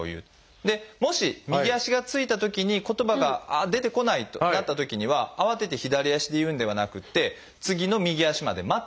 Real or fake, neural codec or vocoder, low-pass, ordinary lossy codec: real; none; none; none